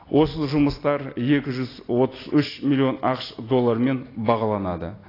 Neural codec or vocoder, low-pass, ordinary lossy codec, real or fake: none; 5.4 kHz; AAC, 24 kbps; real